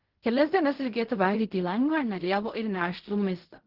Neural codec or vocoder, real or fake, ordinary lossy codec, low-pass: codec, 16 kHz in and 24 kHz out, 0.4 kbps, LongCat-Audio-Codec, fine tuned four codebook decoder; fake; Opus, 16 kbps; 5.4 kHz